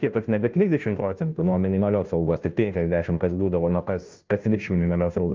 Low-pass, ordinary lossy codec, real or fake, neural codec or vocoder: 7.2 kHz; Opus, 24 kbps; fake; codec, 16 kHz, 0.5 kbps, FunCodec, trained on Chinese and English, 25 frames a second